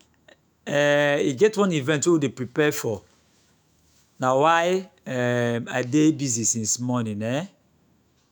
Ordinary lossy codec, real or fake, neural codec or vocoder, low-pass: none; fake; autoencoder, 48 kHz, 128 numbers a frame, DAC-VAE, trained on Japanese speech; none